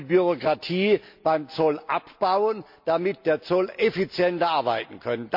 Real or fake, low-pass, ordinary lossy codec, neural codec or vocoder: real; 5.4 kHz; MP3, 48 kbps; none